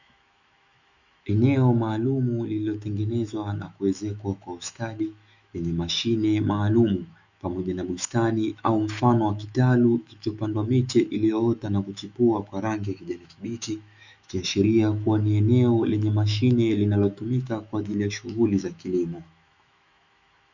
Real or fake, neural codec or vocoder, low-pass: fake; autoencoder, 48 kHz, 128 numbers a frame, DAC-VAE, trained on Japanese speech; 7.2 kHz